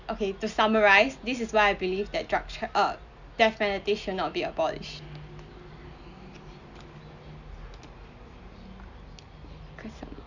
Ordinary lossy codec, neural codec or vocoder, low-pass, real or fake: none; none; 7.2 kHz; real